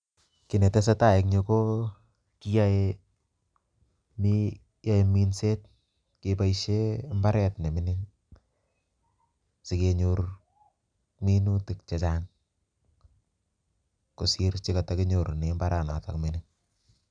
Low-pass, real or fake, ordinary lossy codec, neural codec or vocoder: 9.9 kHz; real; none; none